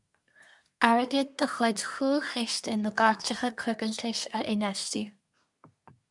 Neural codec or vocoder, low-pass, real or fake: codec, 24 kHz, 1 kbps, SNAC; 10.8 kHz; fake